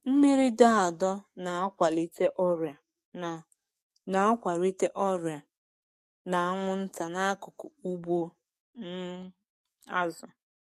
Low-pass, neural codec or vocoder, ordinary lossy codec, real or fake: 14.4 kHz; codec, 44.1 kHz, 7.8 kbps, DAC; MP3, 64 kbps; fake